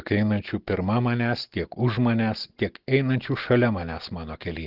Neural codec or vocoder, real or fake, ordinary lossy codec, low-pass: none; real; Opus, 16 kbps; 5.4 kHz